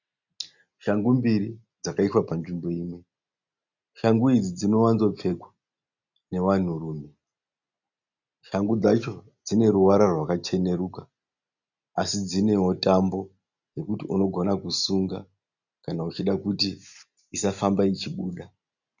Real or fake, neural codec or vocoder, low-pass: real; none; 7.2 kHz